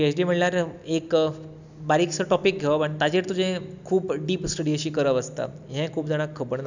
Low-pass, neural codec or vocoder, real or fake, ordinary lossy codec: 7.2 kHz; none; real; none